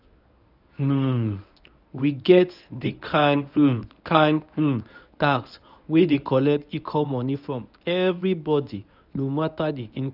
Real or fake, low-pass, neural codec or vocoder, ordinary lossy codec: fake; 5.4 kHz; codec, 24 kHz, 0.9 kbps, WavTokenizer, medium speech release version 1; none